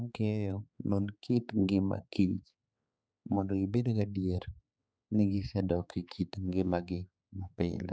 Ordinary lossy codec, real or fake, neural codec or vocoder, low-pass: none; fake; codec, 16 kHz, 4 kbps, X-Codec, HuBERT features, trained on balanced general audio; none